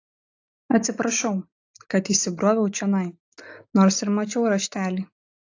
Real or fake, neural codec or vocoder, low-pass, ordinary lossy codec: real; none; 7.2 kHz; AAC, 48 kbps